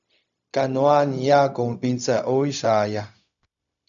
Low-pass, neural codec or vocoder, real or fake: 7.2 kHz; codec, 16 kHz, 0.4 kbps, LongCat-Audio-Codec; fake